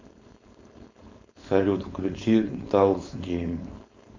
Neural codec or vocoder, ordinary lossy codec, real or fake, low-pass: codec, 16 kHz, 4.8 kbps, FACodec; MP3, 64 kbps; fake; 7.2 kHz